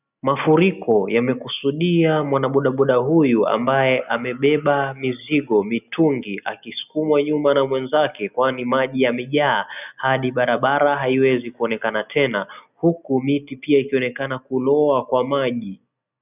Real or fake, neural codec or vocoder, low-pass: real; none; 3.6 kHz